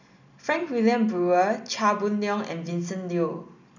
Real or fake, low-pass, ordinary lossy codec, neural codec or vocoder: real; 7.2 kHz; none; none